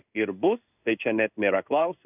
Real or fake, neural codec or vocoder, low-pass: fake; codec, 16 kHz in and 24 kHz out, 1 kbps, XY-Tokenizer; 3.6 kHz